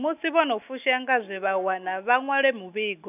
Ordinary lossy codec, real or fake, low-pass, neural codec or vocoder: none; real; 3.6 kHz; none